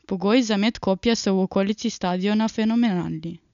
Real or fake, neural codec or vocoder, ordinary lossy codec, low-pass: real; none; none; 7.2 kHz